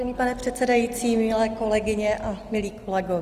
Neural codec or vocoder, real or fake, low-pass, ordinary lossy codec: none; real; 14.4 kHz; Opus, 24 kbps